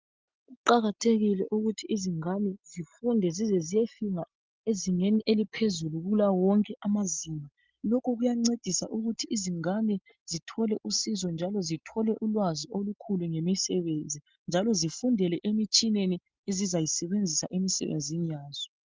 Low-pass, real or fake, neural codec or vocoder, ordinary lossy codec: 7.2 kHz; real; none; Opus, 24 kbps